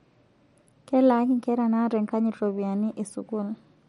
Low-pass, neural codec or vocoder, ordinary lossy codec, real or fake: 19.8 kHz; none; MP3, 48 kbps; real